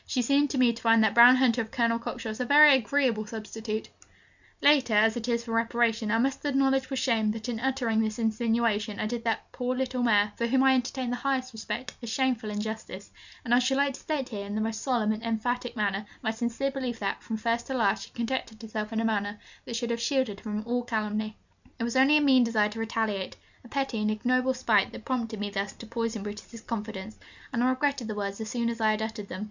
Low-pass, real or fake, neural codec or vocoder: 7.2 kHz; real; none